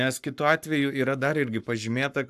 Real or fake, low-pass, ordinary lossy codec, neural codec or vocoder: fake; 14.4 kHz; AAC, 96 kbps; codec, 44.1 kHz, 7.8 kbps, Pupu-Codec